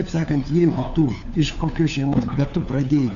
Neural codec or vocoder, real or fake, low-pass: codec, 16 kHz, 4 kbps, FunCodec, trained on LibriTTS, 50 frames a second; fake; 7.2 kHz